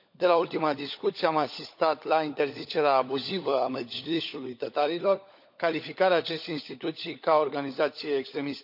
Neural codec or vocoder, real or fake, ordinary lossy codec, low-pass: codec, 16 kHz, 16 kbps, FunCodec, trained on LibriTTS, 50 frames a second; fake; none; 5.4 kHz